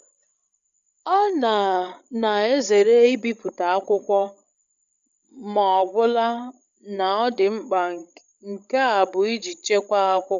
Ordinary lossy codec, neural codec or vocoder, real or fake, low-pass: none; codec, 16 kHz, 16 kbps, FreqCodec, larger model; fake; 7.2 kHz